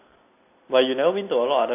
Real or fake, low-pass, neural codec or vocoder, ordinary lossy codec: real; 3.6 kHz; none; MP3, 24 kbps